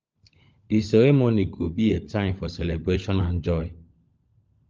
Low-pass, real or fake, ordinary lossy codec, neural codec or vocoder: 7.2 kHz; fake; Opus, 24 kbps; codec, 16 kHz, 16 kbps, FunCodec, trained on LibriTTS, 50 frames a second